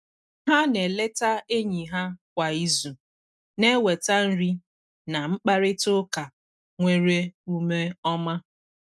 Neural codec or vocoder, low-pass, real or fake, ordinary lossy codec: none; none; real; none